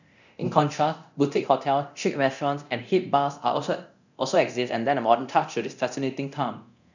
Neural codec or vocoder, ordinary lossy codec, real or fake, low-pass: codec, 24 kHz, 0.9 kbps, DualCodec; none; fake; 7.2 kHz